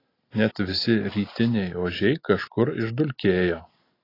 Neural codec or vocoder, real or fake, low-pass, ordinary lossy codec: none; real; 5.4 kHz; AAC, 24 kbps